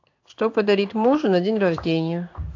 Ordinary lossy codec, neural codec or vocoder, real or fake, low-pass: AAC, 48 kbps; codec, 16 kHz in and 24 kHz out, 1 kbps, XY-Tokenizer; fake; 7.2 kHz